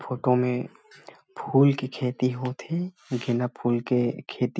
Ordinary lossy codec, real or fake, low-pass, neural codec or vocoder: none; real; none; none